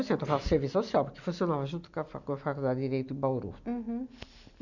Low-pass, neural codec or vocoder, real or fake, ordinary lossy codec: 7.2 kHz; none; real; none